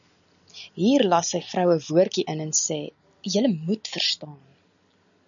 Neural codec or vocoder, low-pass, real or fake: none; 7.2 kHz; real